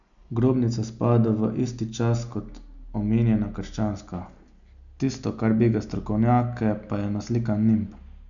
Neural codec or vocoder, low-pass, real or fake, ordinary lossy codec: none; 7.2 kHz; real; none